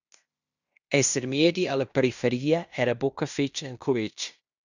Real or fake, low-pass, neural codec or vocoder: fake; 7.2 kHz; codec, 16 kHz in and 24 kHz out, 0.9 kbps, LongCat-Audio-Codec, fine tuned four codebook decoder